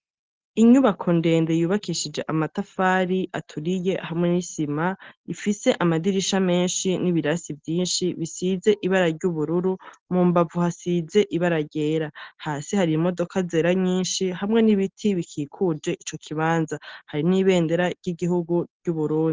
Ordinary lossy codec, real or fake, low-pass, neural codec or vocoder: Opus, 16 kbps; real; 7.2 kHz; none